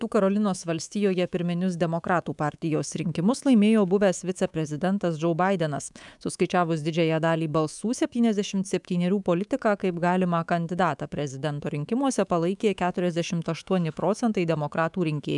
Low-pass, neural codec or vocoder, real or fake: 10.8 kHz; codec, 24 kHz, 3.1 kbps, DualCodec; fake